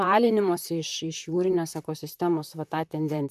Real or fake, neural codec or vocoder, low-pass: fake; vocoder, 44.1 kHz, 128 mel bands, Pupu-Vocoder; 14.4 kHz